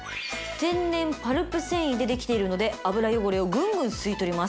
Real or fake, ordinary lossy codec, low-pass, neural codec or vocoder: real; none; none; none